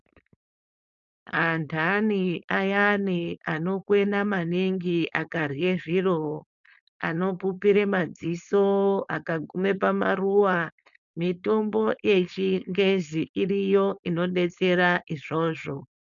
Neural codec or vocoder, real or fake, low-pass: codec, 16 kHz, 4.8 kbps, FACodec; fake; 7.2 kHz